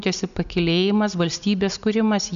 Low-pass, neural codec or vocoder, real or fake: 7.2 kHz; none; real